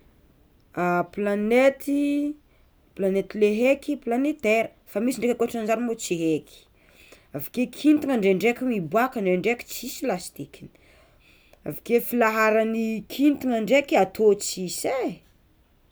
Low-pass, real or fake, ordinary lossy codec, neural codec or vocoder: none; real; none; none